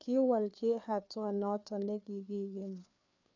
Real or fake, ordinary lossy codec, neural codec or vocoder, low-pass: fake; none; codec, 16 kHz, 4 kbps, FreqCodec, larger model; 7.2 kHz